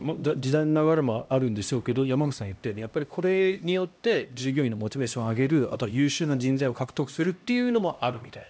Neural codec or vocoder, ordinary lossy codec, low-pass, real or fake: codec, 16 kHz, 1 kbps, X-Codec, HuBERT features, trained on LibriSpeech; none; none; fake